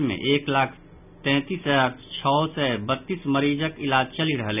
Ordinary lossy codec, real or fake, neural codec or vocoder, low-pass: none; real; none; 3.6 kHz